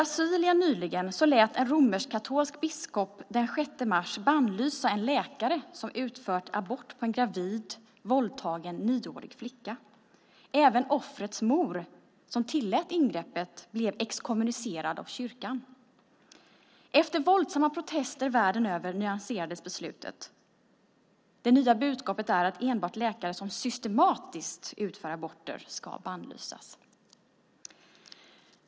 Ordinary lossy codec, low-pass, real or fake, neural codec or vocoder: none; none; real; none